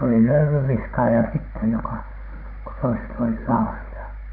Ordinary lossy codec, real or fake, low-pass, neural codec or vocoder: MP3, 32 kbps; fake; 5.4 kHz; codec, 16 kHz, 16 kbps, FunCodec, trained on Chinese and English, 50 frames a second